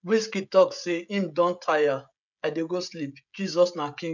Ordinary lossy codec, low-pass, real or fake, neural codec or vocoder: none; 7.2 kHz; fake; codec, 16 kHz, 16 kbps, FreqCodec, smaller model